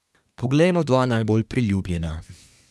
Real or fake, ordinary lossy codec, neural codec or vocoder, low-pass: fake; none; codec, 24 kHz, 1 kbps, SNAC; none